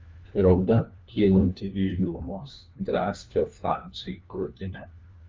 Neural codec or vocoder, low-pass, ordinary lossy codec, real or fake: codec, 16 kHz, 1 kbps, FunCodec, trained on LibriTTS, 50 frames a second; 7.2 kHz; Opus, 32 kbps; fake